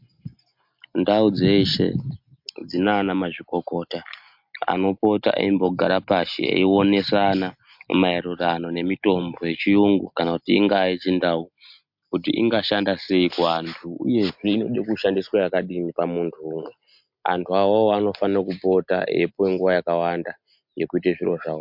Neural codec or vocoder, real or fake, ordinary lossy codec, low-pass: none; real; MP3, 48 kbps; 5.4 kHz